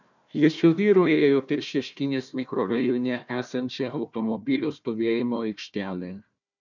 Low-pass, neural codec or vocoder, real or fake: 7.2 kHz; codec, 16 kHz, 1 kbps, FunCodec, trained on Chinese and English, 50 frames a second; fake